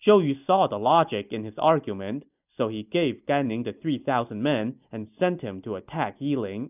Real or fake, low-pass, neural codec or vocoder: real; 3.6 kHz; none